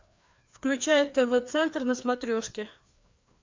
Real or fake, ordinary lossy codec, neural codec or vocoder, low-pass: fake; MP3, 64 kbps; codec, 16 kHz, 2 kbps, FreqCodec, larger model; 7.2 kHz